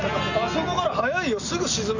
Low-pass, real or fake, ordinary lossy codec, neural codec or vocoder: 7.2 kHz; real; none; none